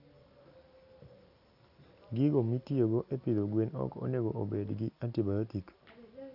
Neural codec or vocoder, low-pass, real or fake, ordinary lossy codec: none; 5.4 kHz; real; none